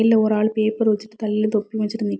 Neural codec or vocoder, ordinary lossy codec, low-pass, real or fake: none; none; none; real